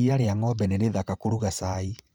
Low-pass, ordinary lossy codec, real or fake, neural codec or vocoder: none; none; fake; vocoder, 44.1 kHz, 128 mel bands every 512 samples, BigVGAN v2